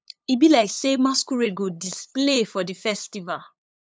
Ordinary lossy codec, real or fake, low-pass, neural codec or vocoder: none; fake; none; codec, 16 kHz, 8 kbps, FunCodec, trained on LibriTTS, 25 frames a second